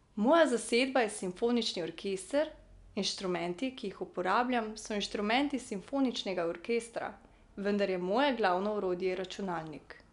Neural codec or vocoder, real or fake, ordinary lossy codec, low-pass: none; real; none; 10.8 kHz